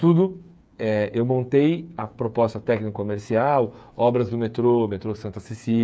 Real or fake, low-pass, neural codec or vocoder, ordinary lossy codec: fake; none; codec, 16 kHz, 8 kbps, FreqCodec, smaller model; none